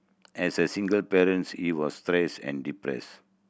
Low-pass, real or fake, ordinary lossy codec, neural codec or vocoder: none; real; none; none